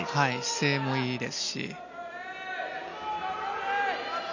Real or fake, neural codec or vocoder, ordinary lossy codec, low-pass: real; none; none; 7.2 kHz